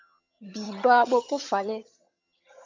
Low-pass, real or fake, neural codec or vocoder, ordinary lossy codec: 7.2 kHz; fake; vocoder, 22.05 kHz, 80 mel bands, HiFi-GAN; MP3, 64 kbps